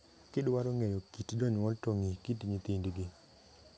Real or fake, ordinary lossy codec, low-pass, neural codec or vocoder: real; none; none; none